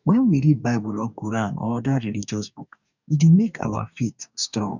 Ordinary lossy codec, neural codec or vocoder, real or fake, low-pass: none; codec, 44.1 kHz, 2.6 kbps, DAC; fake; 7.2 kHz